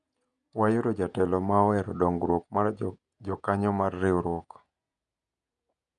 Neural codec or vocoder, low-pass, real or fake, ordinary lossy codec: vocoder, 48 kHz, 128 mel bands, Vocos; 10.8 kHz; fake; none